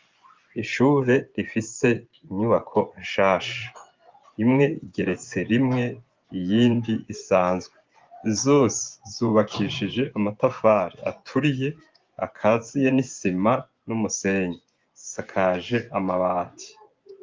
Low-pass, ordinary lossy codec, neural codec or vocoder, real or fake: 7.2 kHz; Opus, 32 kbps; vocoder, 24 kHz, 100 mel bands, Vocos; fake